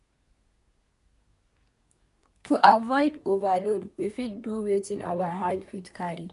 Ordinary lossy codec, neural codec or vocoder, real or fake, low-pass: none; codec, 24 kHz, 1 kbps, SNAC; fake; 10.8 kHz